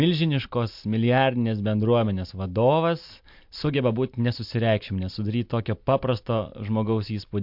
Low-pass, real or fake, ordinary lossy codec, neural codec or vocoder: 5.4 kHz; real; MP3, 48 kbps; none